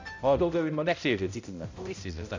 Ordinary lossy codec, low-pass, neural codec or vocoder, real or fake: MP3, 64 kbps; 7.2 kHz; codec, 16 kHz, 0.5 kbps, X-Codec, HuBERT features, trained on balanced general audio; fake